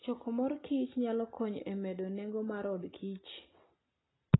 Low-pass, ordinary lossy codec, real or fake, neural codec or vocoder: 7.2 kHz; AAC, 16 kbps; real; none